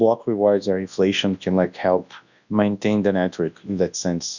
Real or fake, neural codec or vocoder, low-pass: fake; codec, 24 kHz, 0.9 kbps, WavTokenizer, large speech release; 7.2 kHz